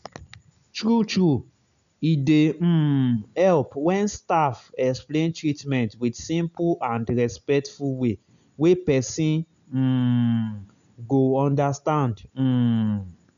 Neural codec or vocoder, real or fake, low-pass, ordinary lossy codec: none; real; 7.2 kHz; none